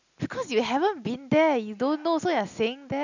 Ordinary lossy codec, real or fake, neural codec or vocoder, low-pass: none; real; none; 7.2 kHz